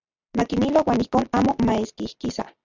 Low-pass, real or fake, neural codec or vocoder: 7.2 kHz; real; none